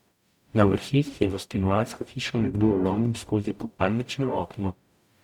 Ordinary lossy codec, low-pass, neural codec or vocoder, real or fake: none; 19.8 kHz; codec, 44.1 kHz, 0.9 kbps, DAC; fake